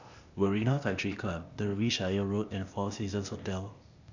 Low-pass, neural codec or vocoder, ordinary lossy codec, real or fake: 7.2 kHz; codec, 16 kHz, 0.8 kbps, ZipCodec; none; fake